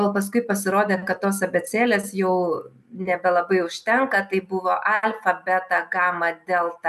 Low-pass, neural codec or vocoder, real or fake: 14.4 kHz; none; real